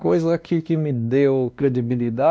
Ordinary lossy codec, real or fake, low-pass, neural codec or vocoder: none; fake; none; codec, 16 kHz, 1 kbps, X-Codec, WavLM features, trained on Multilingual LibriSpeech